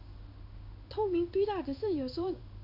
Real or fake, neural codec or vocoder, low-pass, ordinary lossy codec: fake; codec, 16 kHz in and 24 kHz out, 1 kbps, XY-Tokenizer; 5.4 kHz; none